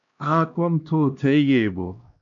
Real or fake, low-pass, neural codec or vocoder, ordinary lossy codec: fake; 7.2 kHz; codec, 16 kHz, 1 kbps, X-Codec, HuBERT features, trained on LibriSpeech; MP3, 64 kbps